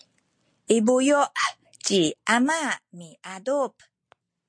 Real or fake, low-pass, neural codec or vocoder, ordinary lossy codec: real; 9.9 kHz; none; MP3, 48 kbps